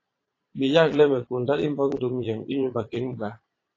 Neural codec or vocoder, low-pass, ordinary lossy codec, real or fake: vocoder, 22.05 kHz, 80 mel bands, Vocos; 7.2 kHz; AAC, 32 kbps; fake